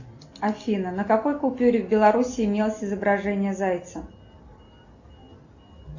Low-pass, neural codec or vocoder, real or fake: 7.2 kHz; none; real